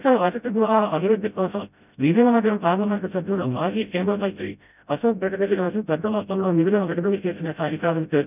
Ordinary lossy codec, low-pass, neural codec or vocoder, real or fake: none; 3.6 kHz; codec, 16 kHz, 0.5 kbps, FreqCodec, smaller model; fake